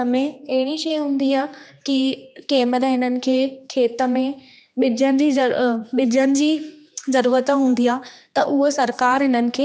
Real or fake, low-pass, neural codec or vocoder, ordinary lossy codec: fake; none; codec, 16 kHz, 2 kbps, X-Codec, HuBERT features, trained on general audio; none